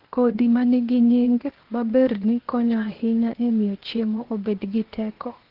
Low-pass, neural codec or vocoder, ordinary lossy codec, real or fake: 5.4 kHz; codec, 16 kHz, 0.8 kbps, ZipCodec; Opus, 16 kbps; fake